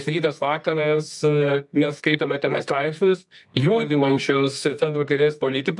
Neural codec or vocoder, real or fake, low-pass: codec, 24 kHz, 0.9 kbps, WavTokenizer, medium music audio release; fake; 10.8 kHz